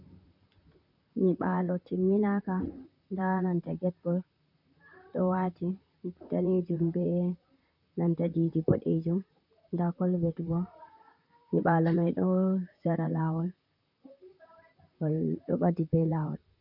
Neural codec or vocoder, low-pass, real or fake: vocoder, 44.1 kHz, 128 mel bands, Pupu-Vocoder; 5.4 kHz; fake